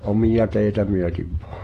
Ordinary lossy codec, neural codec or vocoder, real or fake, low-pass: AAC, 48 kbps; vocoder, 48 kHz, 128 mel bands, Vocos; fake; 14.4 kHz